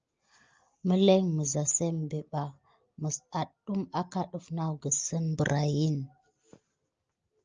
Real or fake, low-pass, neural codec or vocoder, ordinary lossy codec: real; 7.2 kHz; none; Opus, 24 kbps